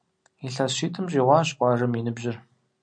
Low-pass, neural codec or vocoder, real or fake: 9.9 kHz; none; real